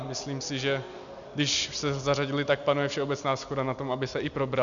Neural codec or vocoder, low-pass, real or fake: none; 7.2 kHz; real